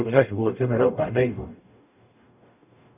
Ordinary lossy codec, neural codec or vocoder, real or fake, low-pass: none; codec, 44.1 kHz, 0.9 kbps, DAC; fake; 3.6 kHz